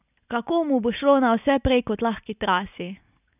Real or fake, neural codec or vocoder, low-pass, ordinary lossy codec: real; none; 3.6 kHz; none